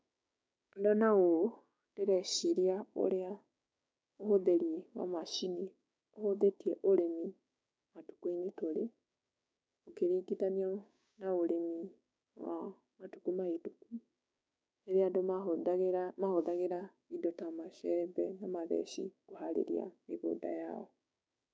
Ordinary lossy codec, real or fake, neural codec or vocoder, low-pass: none; fake; codec, 16 kHz, 6 kbps, DAC; none